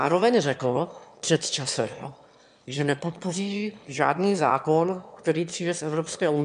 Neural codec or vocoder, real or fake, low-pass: autoencoder, 22.05 kHz, a latent of 192 numbers a frame, VITS, trained on one speaker; fake; 9.9 kHz